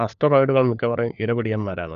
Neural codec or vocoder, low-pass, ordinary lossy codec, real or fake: codec, 16 kHz, 4 kbps, FunCodec, trained on LibriTTS, 50 frames a second; 7.2 kHz; none; fake